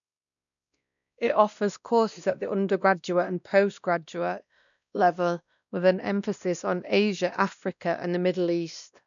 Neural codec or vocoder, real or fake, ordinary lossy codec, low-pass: codec, 16 kHz, 1 kbps, X-Codec, WavLM features, trained on Multilingual LibriSpeech; fake; none; 7.2 kHz